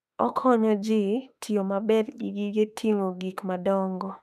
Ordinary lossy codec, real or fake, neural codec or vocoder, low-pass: none; fake; autoencoder, 48 kHz, 32 numbers a frame, DAC-VAE, trained on Japanese speech; 14.4 kHz